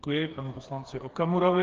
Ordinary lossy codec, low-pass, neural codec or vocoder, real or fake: Opus, 16 kbps; 7.2 kHz; codec, 16 kHz, 4 kbps, FreqCodec, smaller model; fake